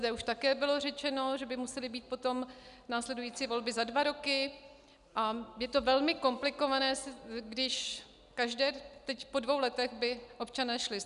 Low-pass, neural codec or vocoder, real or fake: 10.8 kHz; none; real